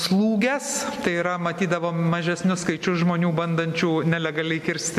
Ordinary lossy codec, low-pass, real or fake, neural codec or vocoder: MP3, 64 kbps; 14.4 kHz; real; none